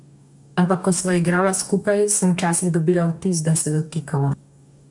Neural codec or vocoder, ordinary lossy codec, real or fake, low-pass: codec, 44.1 kHz, 2.6 kbps, DAC; MP3, 96 kbps; fake; 10.8 kHz